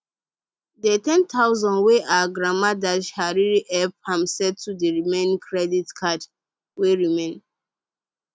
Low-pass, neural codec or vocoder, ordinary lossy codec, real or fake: none; none; none; real